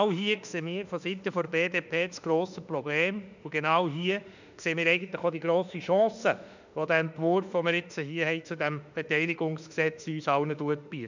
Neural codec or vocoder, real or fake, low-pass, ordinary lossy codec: autoencoder, 48 kHz, 32 numbers a frame, DAC-VAE, trained on Japanese speech; fake; 7.2 kHz; none